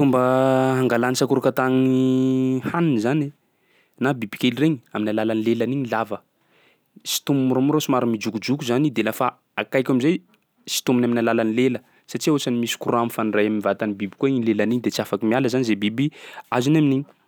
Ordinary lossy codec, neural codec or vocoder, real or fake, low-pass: none; none; real; none